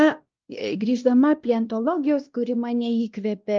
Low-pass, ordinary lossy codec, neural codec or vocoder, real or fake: 7.2 kHz; Opus, 24 kbps; codec, 16 kHz, 1 kbps, X-Codec, WavLM features, trained on Multilingual LibriSpeech; fake